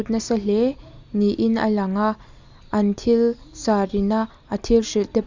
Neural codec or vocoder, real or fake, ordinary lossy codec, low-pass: none; real; Opus, 64 kbps; 7.2 kHz